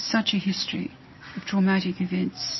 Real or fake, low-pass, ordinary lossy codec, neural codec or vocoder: real; 7.2 kHz; MP3, 24 kbps; none